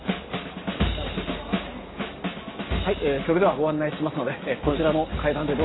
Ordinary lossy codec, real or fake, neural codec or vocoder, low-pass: AAC, 16 kbps; real; none; 7.2 kHz